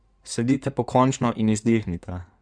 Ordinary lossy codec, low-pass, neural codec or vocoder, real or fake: none; 9.9 kHz; codec, 16 kHz in and 24 kHz out, 2.2 kbps, FireRedTTS-2 codec; fake